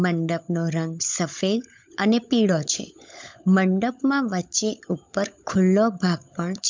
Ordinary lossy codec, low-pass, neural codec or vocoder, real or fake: MP3, 64 kbps; 7.2 kHz; codec, 16 kHz, 16 kbps, FunCodec, trained on LibriTTS, 50 frames a second; fake